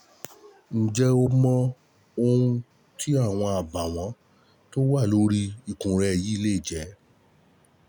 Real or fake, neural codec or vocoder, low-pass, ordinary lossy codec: real; none; none; none